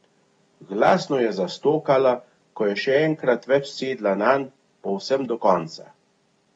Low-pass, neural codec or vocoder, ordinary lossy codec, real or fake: 9.9 kHz; none; AAC, 32 kbps; real